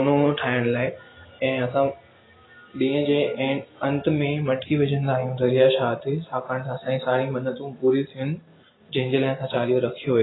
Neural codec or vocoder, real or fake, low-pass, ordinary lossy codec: none; real; 7.2 kHz; AAC, 16 kbps